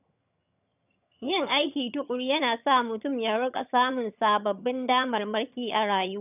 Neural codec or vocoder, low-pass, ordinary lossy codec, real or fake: vocoder, 22.05 kHz, 80 mel bands, HiFi-GAN; 3.6 kHz; none; fake